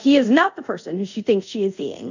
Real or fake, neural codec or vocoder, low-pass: fake; codec, 24 kHz, 0.5 kbps, DualCodec; 7.2 kHz